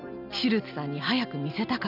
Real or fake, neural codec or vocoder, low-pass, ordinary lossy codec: real; none; 5.4 kHz; none